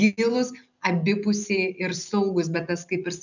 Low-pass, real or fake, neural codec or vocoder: 7.2 kHz; real; none